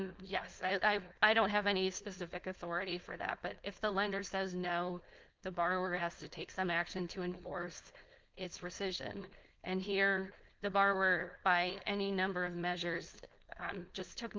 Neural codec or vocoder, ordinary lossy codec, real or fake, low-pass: codec, 16 kHz, 4.8 kbps, FACodec; Opus, 32 kbps; fake; 7.2 kHz